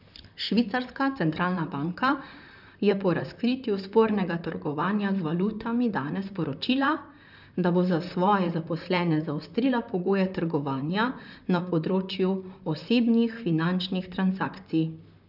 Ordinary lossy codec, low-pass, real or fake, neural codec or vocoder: none; 5.4 kHz; fake; vocoder, 44.1 kHz, 128 mel bands, Pupu-Vocoder